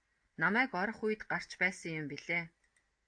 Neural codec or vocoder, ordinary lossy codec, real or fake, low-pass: none; AAC, 64 kbps; real; 9.9 kHz